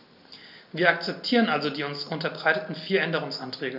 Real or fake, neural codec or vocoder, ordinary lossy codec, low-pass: real; none; AAC, 48 kbps; 5.4 kHz